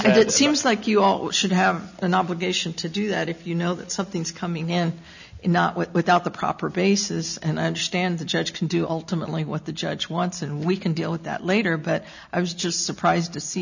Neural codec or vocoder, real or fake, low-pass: none; real; 7.2 kHz